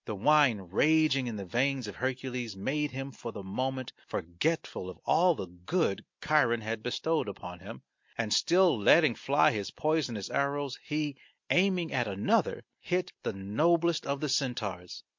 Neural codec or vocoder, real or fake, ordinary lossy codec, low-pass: none; real; MP3, 48 kbps; 7.2 kHz